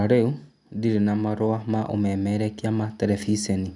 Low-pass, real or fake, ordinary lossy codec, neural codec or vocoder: 10.8 kHz; real; none; none